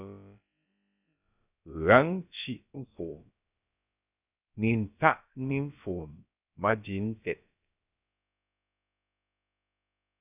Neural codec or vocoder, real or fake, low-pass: codec, 16 kHz, about 1 kbps, DyCAST, with the encoder's durations; fake; 3.6 kHz